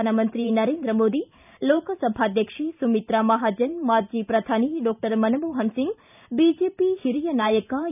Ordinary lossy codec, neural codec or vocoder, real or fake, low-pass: none; vocoder, 44.1 kHz, 128 mel bands every 512 samples, BigVGAN v2; fake; 3.6 kHz